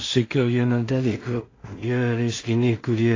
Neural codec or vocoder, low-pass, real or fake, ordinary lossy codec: codec, 16 kHz in and 24 kHz out, 0.4 kbps, LongCat-Audio-Codec, two codebook decoder; 7.2 kHz; fake; AAC, 32 kbps